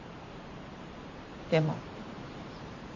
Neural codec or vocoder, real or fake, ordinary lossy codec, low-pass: vocoder, 44.1 kHz, 128 mel bands every 512 samples, BigVGAN v2; fake; none; 7.2 kHz